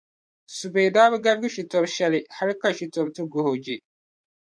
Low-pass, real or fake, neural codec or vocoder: 9.9 kHz; fake; vocoder, 24 kHz, 100 mel bands, Vocos